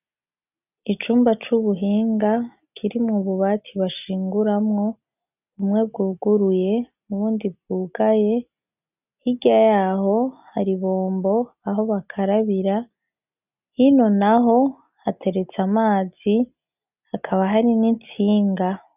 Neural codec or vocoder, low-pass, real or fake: none; 3.6 kHz; real